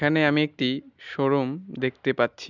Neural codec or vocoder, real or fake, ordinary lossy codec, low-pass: none; real; none; 7.2 kHz